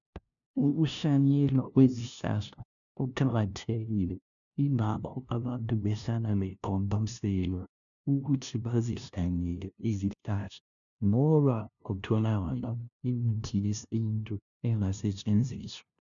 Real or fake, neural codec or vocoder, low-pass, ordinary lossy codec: fake; codec, 16 kHz, 0.5 kbps, FunCodec, trained on LibriTTS, 25 frames a second; 7.2 kHz; MP3, 96 kbps